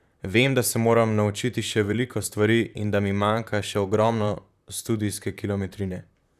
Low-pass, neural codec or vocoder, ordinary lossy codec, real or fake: 14.4 kHz; vocoder, 44.1 kHz, 128 mel bands, Pupu-Vocoder; none; fake